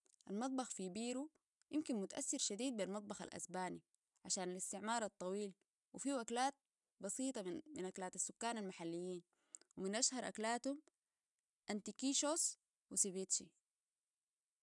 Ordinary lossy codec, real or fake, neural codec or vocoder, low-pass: none; real; none; 10.8 kHz